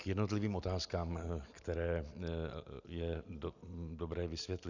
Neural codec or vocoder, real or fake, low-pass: none; real; 7.2 kHz